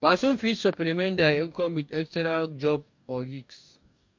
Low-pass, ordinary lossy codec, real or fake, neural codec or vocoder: 7.2 kHz; MP3, 48 kbps; fake; codec, 44.1 kHz, 2.6 kbps, DAC